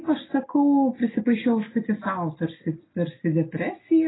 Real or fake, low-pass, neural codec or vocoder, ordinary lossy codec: real; 7.2 kHz; none; AAC, 16 kbps